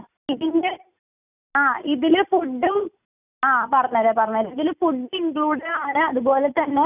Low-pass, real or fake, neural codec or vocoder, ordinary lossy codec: 3.6 kHz; real; none; none